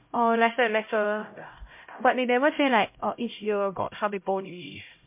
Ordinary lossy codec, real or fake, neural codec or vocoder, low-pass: MP3, 32 kbps; fake; codec, 16 kHz, 0.5 kbps, X-Codec, HuBERT features, trained on LibriSpeech; 3.6 kHz